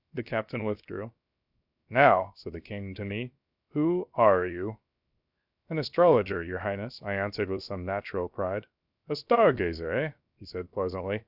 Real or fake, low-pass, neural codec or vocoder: fake; 5.4 kHz; codec, 16 kHz, 0.7 kbps, FocalCodec